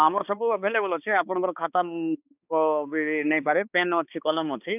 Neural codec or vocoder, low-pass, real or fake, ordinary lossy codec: codec, 16 kHz, 2 kbps, X-Codec, HuBERT features, trained on balanced general audio; 3.6 kHz; fake; none